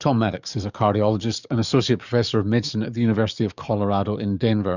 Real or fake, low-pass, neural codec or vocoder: fake; 7.2 kHz; codec, 44.1 kHz, 7.8 kbps, DAC